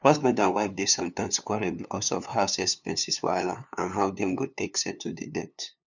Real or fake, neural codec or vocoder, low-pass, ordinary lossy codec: fake; codec, 16 kHz, 2 kbps, FunCodec, trained on LibriTTS, 25 frames a second; 7.2 kHz; none